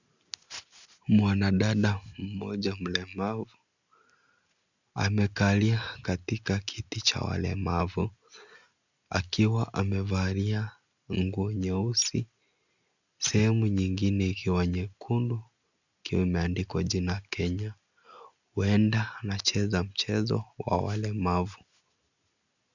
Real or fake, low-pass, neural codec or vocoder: real; 7.2 kHz; none